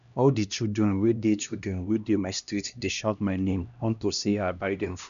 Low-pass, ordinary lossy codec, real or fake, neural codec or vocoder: 7.2 kHz; none; fake; codec, 16 kHz, 1 kbps, X-Codec, HuBERT features, trained on LibriSpeech